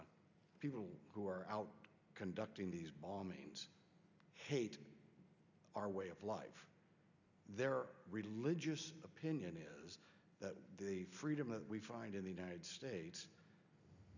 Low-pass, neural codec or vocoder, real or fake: 7.2 kHz; none; real